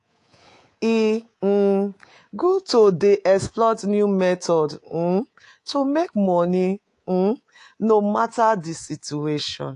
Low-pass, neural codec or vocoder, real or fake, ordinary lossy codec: 10.8 kHz; codec, 24 kHz, 3.1 kbps, DualCodec; fake; AAC, 48 kbps